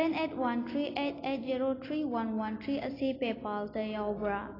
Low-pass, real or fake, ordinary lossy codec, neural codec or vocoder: 5.4 kHz; real; AAC, 24 kbps; none